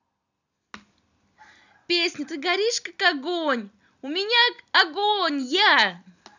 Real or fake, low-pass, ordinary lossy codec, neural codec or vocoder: real; 7.2 kHz; none; none